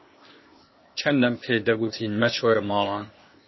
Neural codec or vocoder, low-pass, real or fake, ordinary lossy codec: codec, 16 kHz, 0.8 kbps, ZipCodec; 7.2 kHz; fake; MP3, 24 kbps